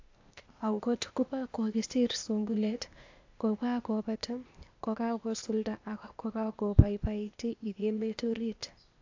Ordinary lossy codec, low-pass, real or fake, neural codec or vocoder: AAC, 48 kbps; 7.2 kHz; fake; codec, 16 kHz, 0.8 kbps, ZipCodec